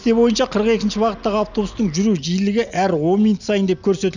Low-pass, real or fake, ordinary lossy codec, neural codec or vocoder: 7.2 kHz; real; none; none